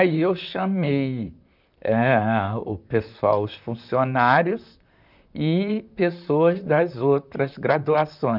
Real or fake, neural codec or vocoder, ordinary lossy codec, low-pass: fake; vocoder, 44.1 kHz, 128 mel bands, Pupu-Vocoder; none; 5.4 kHz